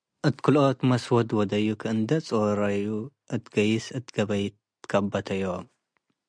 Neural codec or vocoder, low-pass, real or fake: none; 9.9 kHz; real